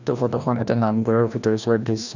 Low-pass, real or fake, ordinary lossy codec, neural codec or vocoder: 7.2 kHz; fake; none; codec, 16 kHz, 1 kbps, FreqCodec, larger model